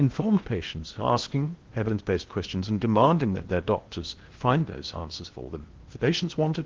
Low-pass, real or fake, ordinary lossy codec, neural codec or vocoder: 7.2 kHz; fake; Opus, 16 kbps; codec, 16 kHz in and 24 kHz out, 0.8 kbps, FocalCodec, streaming, 65536 codes